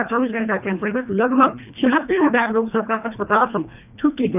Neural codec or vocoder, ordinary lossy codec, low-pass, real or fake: codec, 24 kHz, 1.5 kbps, HILCodec; none; 3.6 kHz; fake